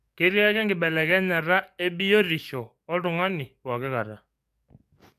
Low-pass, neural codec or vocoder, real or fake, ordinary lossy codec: 14.4 kHz; codec, 44.1 kHz, 7.8 kbps, DAC; fake; AAC, 96 kbps